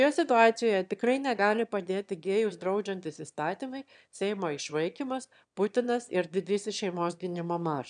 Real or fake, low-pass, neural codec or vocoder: fake; 9.9 kHz; autoencoder, 22.05 kHz, a latent of 192 numbers a frame, VITS, trained on one speaker